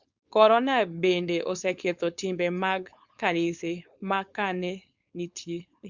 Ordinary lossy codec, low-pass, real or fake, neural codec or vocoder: Opus, 64 kbps; 7.2 kHz; fake; codec, 16 kHz, 4.8 kbps, FACodec